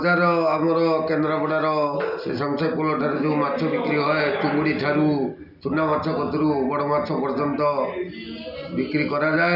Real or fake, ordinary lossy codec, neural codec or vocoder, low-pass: real; none; none; 5.4 kHz